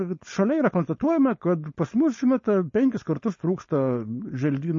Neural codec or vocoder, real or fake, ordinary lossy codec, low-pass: codec, 16 kHz, 4.8 kbps, FACodec; fake; MP3, 32 kbps; 7.2 kHz